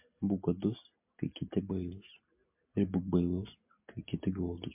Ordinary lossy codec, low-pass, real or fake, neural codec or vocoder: MP3, 32 kbps; 3.6 kHz; real; none